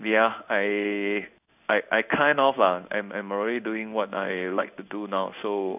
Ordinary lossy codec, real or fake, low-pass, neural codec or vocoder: none; fake; 3.6 kHz; codec, 16 kHz in and 24 kHz out, 1 kbps, XY-Tokenizer